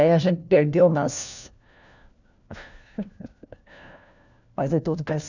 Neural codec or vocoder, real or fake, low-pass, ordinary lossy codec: codec, 16 kHz, 1 kbps, FunCodec, trained on LibriTTS, 50 frames a second; fake; 7.2 kHz; none